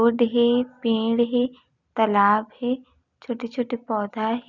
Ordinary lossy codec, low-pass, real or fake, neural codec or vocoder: none; 7.2 kHz; real; none